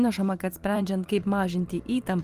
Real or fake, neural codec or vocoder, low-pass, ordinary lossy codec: fake; vocoder, 44.1 kHz, 128 mel bands every 512 samples, BigVGAN v2; 14.4 kHz; Opus, 32 kbps